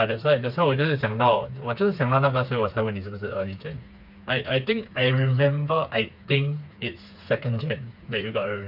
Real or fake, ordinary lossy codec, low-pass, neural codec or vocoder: fake; none; 5.4 kHz; codec, 16 kHz, 4 kbps, FreqCodec, smaller model